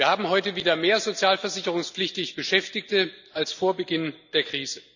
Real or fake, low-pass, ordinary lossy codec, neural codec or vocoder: real; 7.2 kHz; none; none